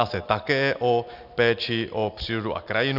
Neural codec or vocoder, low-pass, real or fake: none; 5.4 kHz; real